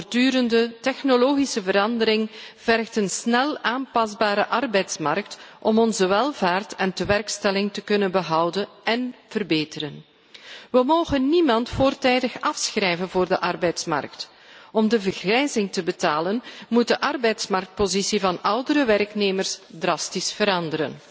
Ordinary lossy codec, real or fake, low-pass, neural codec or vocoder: none; real; none; none